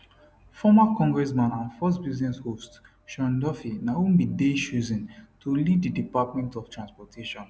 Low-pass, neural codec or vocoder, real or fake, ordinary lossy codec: none; none; real; none